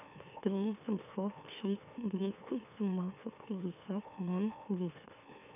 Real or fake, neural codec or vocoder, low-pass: fake; autoencoder, 44.1 kHz, a latent of 192 numbers a frame, MeloTTS; 3.6 kHz